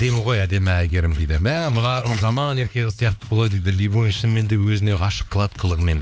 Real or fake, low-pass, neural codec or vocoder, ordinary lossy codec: fake; none; codec, 16 kHz, 2 kbps, X-Codec, HuBERT features, trained on LibriSpeech; none